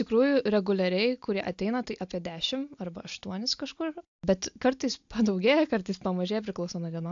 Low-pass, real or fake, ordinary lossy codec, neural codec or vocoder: 7.2 kHz; real; AAC, 64 kbps; none